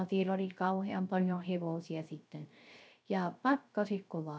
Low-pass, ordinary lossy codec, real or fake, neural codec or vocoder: none; none; fake; codec, 16 kHz, 0.3 kbps, FocalCodec